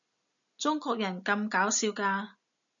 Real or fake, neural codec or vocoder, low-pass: real; none; 7.2 kHz